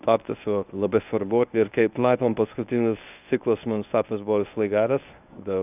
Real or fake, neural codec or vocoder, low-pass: fake; codec, 24 kHz, 0.9 kbps, WavTokenizer, medium speech release version 1; 3.6 kHz